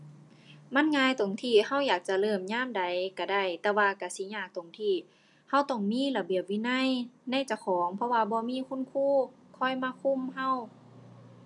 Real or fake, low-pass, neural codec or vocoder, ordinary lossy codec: real; 10.8 kHz; none; none